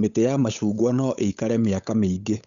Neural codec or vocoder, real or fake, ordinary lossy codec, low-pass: codec, 16 kHz, 8 kbps, FunCodec, trained on Chinese and English, 25 frames a second; fake; none; 7.2 kHz